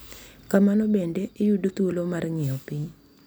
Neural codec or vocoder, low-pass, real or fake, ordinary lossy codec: none; none; real; none